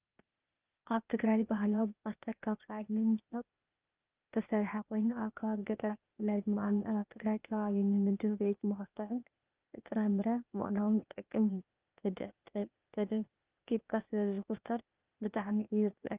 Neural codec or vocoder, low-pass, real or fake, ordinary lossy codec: codec, 16 kHz, 0.8 kbps, ZipCodec; 3.6 kHz; fake; Opus, 24 kbps